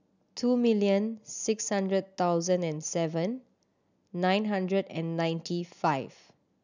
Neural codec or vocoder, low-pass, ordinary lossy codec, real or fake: none; 7.2 kHz; none; real